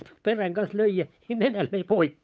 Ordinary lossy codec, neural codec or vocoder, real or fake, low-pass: none; codec, 16 kHz, 8 kbps, FunCodec, trained on Chinese and English, 25 frames a second; fake; none